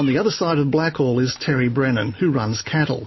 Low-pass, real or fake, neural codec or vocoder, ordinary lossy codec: 7.2 kHz; real; none; MP3, 24 kbps